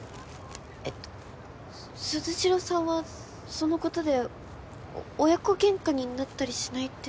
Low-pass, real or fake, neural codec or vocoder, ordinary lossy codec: none; real; none; none